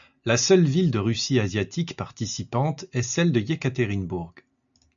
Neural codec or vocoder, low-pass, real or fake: none; 7.2 kHz; real